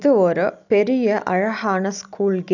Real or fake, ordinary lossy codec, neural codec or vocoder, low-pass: real; none; none; 7.2 kHz